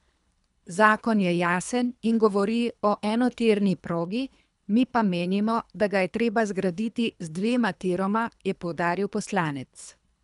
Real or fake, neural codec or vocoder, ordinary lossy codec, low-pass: fake; codec, 24 kHz, 3 kbps, HILCodec; none; 10.8 kHz